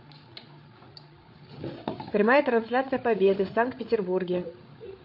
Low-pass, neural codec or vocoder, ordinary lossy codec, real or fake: 5.4 kHz; codec, 16 kHz, 8 kbps, FreqCodec, larger model; MP3, 32 kbps; fake